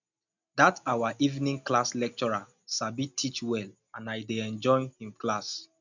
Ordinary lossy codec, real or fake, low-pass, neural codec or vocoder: none; real; 7.2 kHz; none